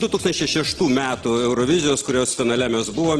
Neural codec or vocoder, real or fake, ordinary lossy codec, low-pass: none; real; Opus, 16 kbps; 9.9 kHz